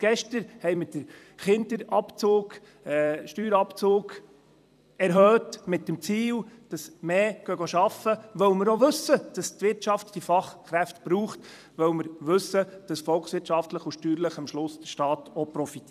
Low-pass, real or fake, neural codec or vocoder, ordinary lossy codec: 14.4 kHz; fake; vocoder, 44.1 kHz, 128 mel bands every 256 samples, BigVGAN v2; none